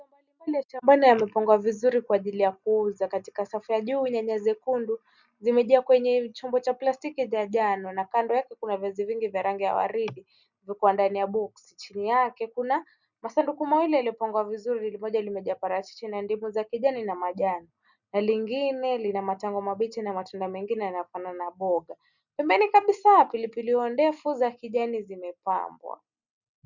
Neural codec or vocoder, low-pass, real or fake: none; 7.2 kHz; real